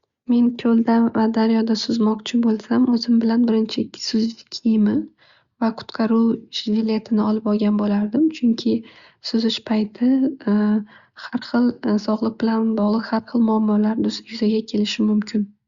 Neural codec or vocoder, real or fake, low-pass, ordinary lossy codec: none; real; 7.2 kHz; Opus, 64 kbps